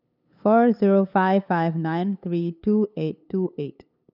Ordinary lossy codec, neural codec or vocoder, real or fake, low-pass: none; codec, 16 kHz, 8 kbps, FunCodec, trained on LibriTTS, 25 frames a second; fake; 5.4 kHz